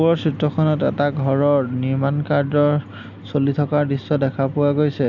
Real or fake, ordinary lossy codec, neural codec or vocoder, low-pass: real; none; none; 7.2 kHz